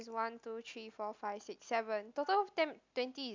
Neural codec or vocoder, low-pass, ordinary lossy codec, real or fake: none; 7.2 kHz; MP3, 64 kbps; real